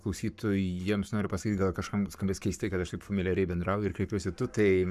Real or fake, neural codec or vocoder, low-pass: fake; codec, 44.1 kHz, 7.8 kbps, Pupu-Codec; 14.4 kHz